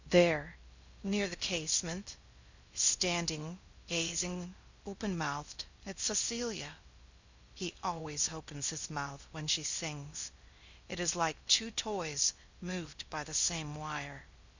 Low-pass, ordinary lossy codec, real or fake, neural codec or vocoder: 7.2 kHz; Opus, 64 kbps; fake; codec, 16 kHz in and 24 kHz out, 0.6 kbps, FocalCodec, streaming, 4096 codes